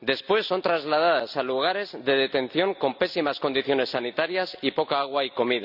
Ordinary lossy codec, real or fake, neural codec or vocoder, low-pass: none; real; none; 5.4 kHz